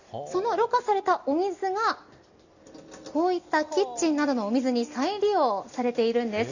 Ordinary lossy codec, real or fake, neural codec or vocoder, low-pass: none; real; none; 7.2 kHz